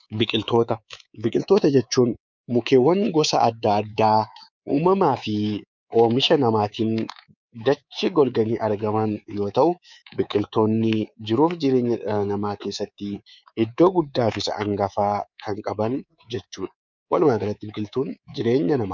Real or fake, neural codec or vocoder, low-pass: fake; codec, 24 kHz, 3.1 kbps, DualCodec; 7.2 kHz